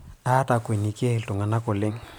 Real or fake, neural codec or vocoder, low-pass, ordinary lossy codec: real; none; none; none